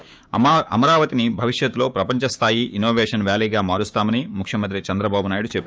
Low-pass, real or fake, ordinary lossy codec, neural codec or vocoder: none; fake; none; codec, 16 kHz, 6 kbps, DAC